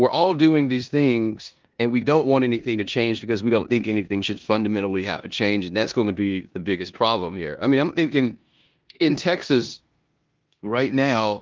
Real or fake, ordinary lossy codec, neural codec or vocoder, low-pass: fake; Opus, 32 kbps; codec, 16 kHz in and 24 kHz out, 0.9 kbps, LongCat-Audio-Codec, four codebook decoder; 7.2 kHz